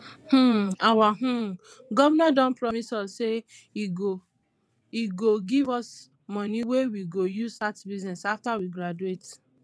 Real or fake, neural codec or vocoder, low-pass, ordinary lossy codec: fake; vocoder, 22.05 kHz, 80 mel bands, WaveNeXt; none; none